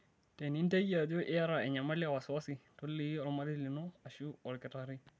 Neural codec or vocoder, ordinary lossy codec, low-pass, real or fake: none; none; none; real